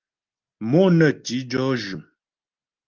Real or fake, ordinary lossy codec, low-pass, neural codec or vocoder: real; Opus, 24 kbps; 7.2 kHz; none